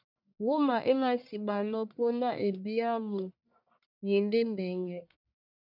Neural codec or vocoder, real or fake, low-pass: codec, 44.1 kHz, 1.7 kbps, Pupu-Codec; fake; 5.4 kHz